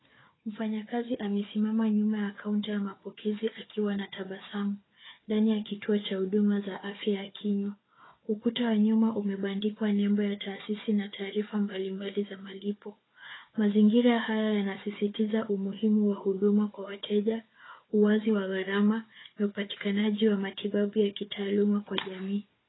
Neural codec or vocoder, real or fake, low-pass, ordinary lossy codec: codec, 16 kHz, 4 kbps, FunCodec, trained on Chinese and English, 50 frames a second; fake; 7.2 kHz; AAC, 16 kbps